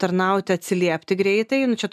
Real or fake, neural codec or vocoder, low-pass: real; none; 14.4 kHz